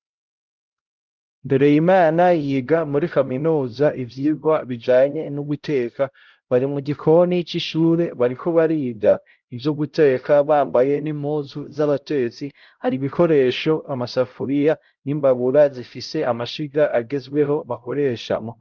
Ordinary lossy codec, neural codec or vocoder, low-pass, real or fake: Opus, 32 kbps; codec, 16 kHz, 0.5 kbps, X-Codec, HuBERT features, trained on LibriSpeech; 7.2 kHz; fake